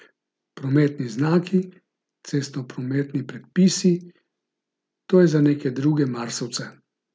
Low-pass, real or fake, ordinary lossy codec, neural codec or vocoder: none; real; none; none